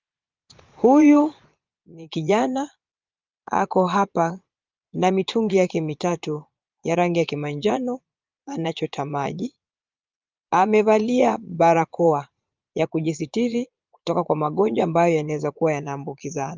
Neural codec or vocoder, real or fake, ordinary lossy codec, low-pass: vocoder, 22.05 kHz, 80 mel bands, Vocos; fake; Opus, 24 kbps; 7.2 kHz